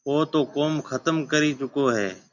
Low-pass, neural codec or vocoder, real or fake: 7.2 kHz; none; real